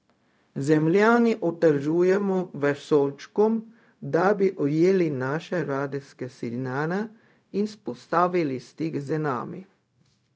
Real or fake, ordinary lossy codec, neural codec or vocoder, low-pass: fake; none; codec, 16 kHz, 0.4 kbps, LongCat-Audio-Codec; none